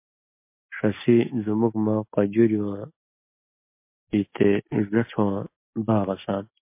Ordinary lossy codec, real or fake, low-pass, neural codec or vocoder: MP3, 24 kbps; real; 3.6 kHz; none